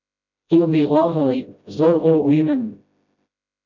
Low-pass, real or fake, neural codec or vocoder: 7.2 kHz; fake; codec, 16 kHz, 0.5 kbps, FreqCodec, smaller model